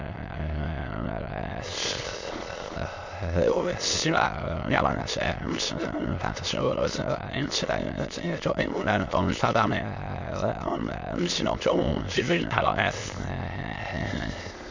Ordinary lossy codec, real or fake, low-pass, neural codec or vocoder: MP3, 48 kbps; fake; 7.2 kHz; autoencoder, 22.05 kHz, a latent of 192 numbers a frame, VITS, trained on many speakers